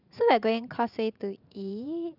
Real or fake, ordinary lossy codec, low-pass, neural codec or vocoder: fake; none; 5.4 kHz; vocoder, 44.1 kHz, 80 mel bands, Vocos